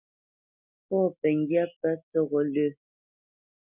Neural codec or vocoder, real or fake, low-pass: none; real; 3.6 kHz